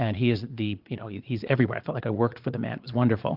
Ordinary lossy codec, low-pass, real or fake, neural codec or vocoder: Opus, 32 kbps; 5.4 kHz; fake; vocoder, 22.05 kHz, 80 mel bands, Vocos